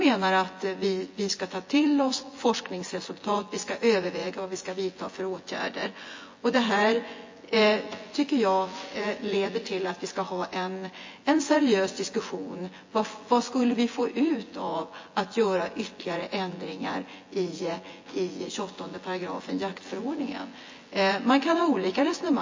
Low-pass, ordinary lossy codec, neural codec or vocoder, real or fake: 7.2 kHz; MP3, 32 kbps; vocoder, 24 kHz, 100 mel bands, Vocos; fake